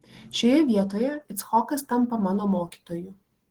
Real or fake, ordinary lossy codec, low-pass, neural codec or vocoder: real; Opus, 16 kbps; 19.8 kHz; none